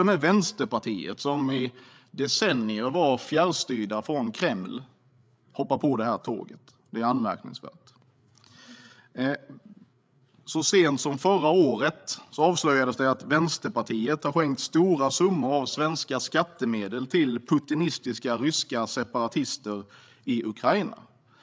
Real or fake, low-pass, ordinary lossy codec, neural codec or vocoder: fake; none; none; codec, 16 kHz, 8 kbps, FreqCodec, larger model